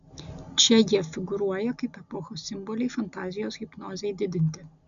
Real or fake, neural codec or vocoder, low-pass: real; none; 7.2 kHz